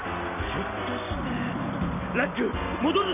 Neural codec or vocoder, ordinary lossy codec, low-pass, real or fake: none; none; 3.6 kHz; real